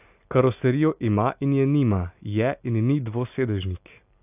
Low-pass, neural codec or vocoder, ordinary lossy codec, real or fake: 3.6 kHz; none; AAC, 32 kbps; real